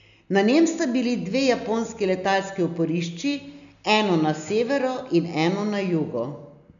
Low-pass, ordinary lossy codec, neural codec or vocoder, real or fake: 7.2 kHz; none; none; real